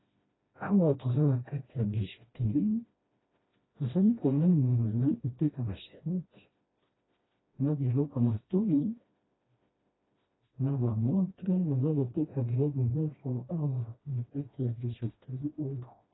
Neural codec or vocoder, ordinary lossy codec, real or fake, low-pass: codec, 16 kHz, 1 kbps, FreqCodec, smaller model; AAC, 16 kbps; fake; 7.2 kHz